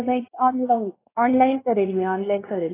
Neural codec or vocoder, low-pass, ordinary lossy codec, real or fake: codec, 16 kHz, 2 kbps, X-Codec, WavLM features, trained on Multilingual LibriSpeech; 3.6 kHz; AAC, 16 kbps; fake